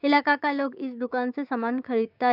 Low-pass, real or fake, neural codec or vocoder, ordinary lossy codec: 5.4 kHz; fake; codec, 16 kHz, 6 kbps, DAC; none